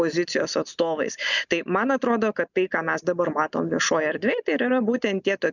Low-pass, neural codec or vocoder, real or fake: 7.2 kHz; none; real